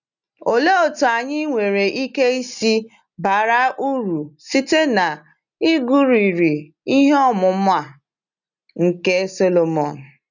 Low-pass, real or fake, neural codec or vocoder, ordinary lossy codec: 7.2 kHz; real; none; none